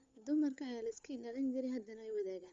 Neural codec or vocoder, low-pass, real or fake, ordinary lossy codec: codec, 16 kHz, 8 kbps, FunCodec, trained on Chinese and English, 25 frames a second; 7.2 kHz; fake; Opus, 32 kbps